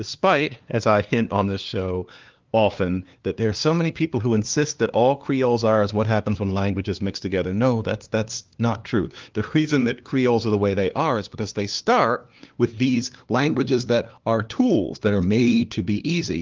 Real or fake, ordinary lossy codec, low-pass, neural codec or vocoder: fake; Opus, 32 kbps; 7.2 kHz; codec, 16 kHz, 2 kbps, FunCodec, trained on LibriTTS, 25 frames a second